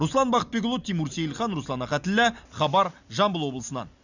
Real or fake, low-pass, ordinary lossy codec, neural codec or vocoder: real; 7.2 kHz; none; none